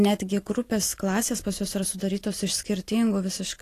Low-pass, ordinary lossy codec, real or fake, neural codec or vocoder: 14.4 kHz; AAC, 48 kbps; real; none